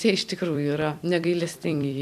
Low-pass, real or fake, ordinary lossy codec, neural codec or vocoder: 14.4 kHz; fake; MP3, 96 kbps; vocoder, 44.1 kHz, 128 mel bands every 256 samples, BigVGAN v2